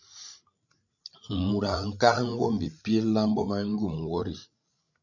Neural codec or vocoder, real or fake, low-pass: codec, 16 kHz, 16 kbps, FreqCodec, larger model; fake; 7.2 kHz